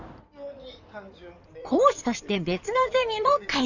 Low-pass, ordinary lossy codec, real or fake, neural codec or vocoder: 7.2 kHz; none; fake; codec, 16 kHz in and 24 kHz out, 2.2 kbps, FireRedTTS-2 codec